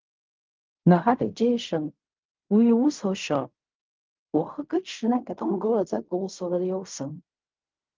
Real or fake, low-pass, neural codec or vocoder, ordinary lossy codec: fake; 7.2 kHz; codec, 16 kHz in and 24 kHz out, 0.4 kbps, LongCat-Audio-Codec, fine tuned four codebook decoder; Opus, 24 kbps